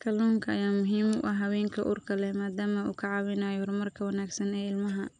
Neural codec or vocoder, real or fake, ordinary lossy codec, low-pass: none; real; none; 9.9 kHz